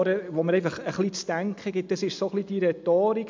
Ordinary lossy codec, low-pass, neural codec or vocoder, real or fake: MP3, 64 kbps; 7.2 kHz; none; real